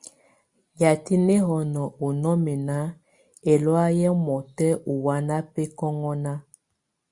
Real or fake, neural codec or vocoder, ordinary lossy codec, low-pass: real; none; Opus, 64 kbps; 10.8 kHz